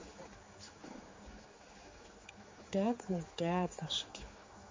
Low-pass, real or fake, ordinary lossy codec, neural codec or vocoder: 7.2 kHz; fake; MP3, 32 kbps; codec, 16 kHz, 4 kbps, X-Codec, HuBERT features, trained on general audio